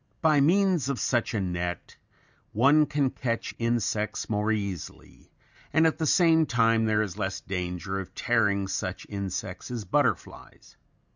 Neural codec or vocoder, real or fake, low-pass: none; real; 7.2 kHz